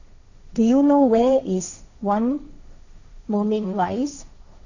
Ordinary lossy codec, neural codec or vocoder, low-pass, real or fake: none; codec, 16 kHz, 1.1 kbps, Voila-Tokenizer; 7.2 kHz; fake